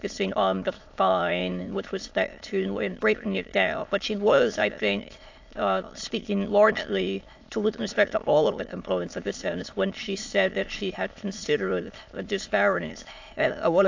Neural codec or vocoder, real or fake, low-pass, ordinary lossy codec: autoencoder, 22.05 kHz, a latent of 192 numbers a frame, VITS, trained on many speakers; fake; 7.2 kHz; AAC, 48 kbps